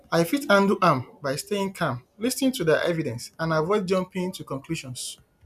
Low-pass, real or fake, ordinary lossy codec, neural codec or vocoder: 14.4 kHz; real; none; none